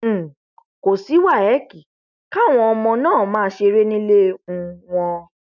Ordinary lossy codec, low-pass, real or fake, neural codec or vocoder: none; 7.2 kHz; real; none